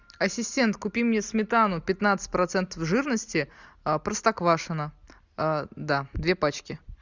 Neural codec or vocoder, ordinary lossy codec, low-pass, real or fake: none; Opus, 64 kbps; 7.2 kHz; real